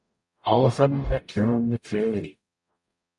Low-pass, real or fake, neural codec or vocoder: 10.8 kHz; fake; codec, 44.1 kHz, 0.9 kbps, DAC